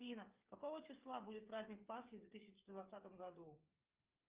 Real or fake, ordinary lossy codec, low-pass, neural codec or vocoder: fake; Opus, 24 kbps; 3.6 kHz; codec, 16 kHz, 8 kbps, FreqCodec, smaller model